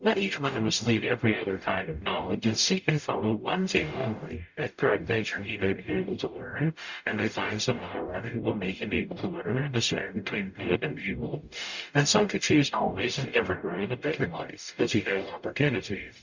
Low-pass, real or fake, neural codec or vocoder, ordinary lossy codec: 7.2 kHz; fake; codec, 44.1 kHz, 0.9 kbps, DAC; Opus, 64 kbps